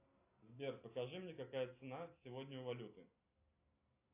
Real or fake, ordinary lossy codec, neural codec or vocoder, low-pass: real; AAC, 32 kbps; none; 3.6 kHz